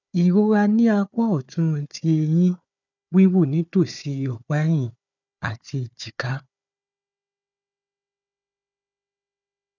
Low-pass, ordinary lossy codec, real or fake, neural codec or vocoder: 7.2 kHz; none; fake; codec, 16 kHz, 4 kbps, FunCodec, trained on Chinese and English, 50 frames a second